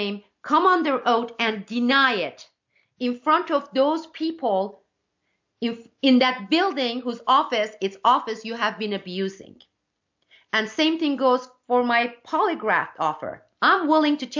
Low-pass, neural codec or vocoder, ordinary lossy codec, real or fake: 7.2 kHz; none; MP3, 64 kbps; real